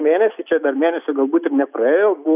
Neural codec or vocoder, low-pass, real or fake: none; 3.6 kHz; real